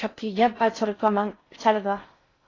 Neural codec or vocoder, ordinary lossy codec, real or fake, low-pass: codec, 16 kHz in and 24 kHz out, 0.6 kbps, FocalCodec, streaming, 4096 codes; AAC, 32 kbps; fake; 7.2 kHz